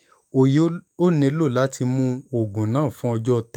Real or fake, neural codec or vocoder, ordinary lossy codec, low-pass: fake; codec, 44.1 kHz, 7.8 kbps, DAC; none; 19.8 kHz